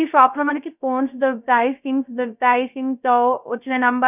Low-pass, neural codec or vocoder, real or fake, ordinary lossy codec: 3.6 kHz; codec, 16 kHz, 0.3 kbps, FocalCodec; fake; none